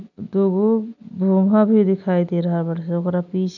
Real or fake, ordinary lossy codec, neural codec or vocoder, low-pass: real; none; none; 7.2 kHz